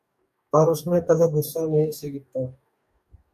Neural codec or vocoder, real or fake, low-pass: codec, 44.1 kHz, 2.6 kbps, DAC; fake; 14.4 kHz